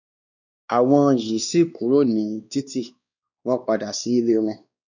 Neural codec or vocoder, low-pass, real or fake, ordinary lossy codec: codec, 16 kHz, 2 kbps, X-Codec, WavLM features, trained on Multilingual LibriSpeech; 7.2 kHz; fake; none